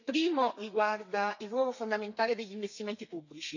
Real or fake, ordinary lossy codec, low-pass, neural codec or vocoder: fake; none; 7.2 kHz; codec, 32 kHz, 1.9 kbps, SNAC